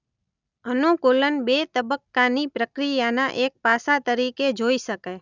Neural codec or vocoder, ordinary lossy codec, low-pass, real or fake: none; none; 7.2 kHz; real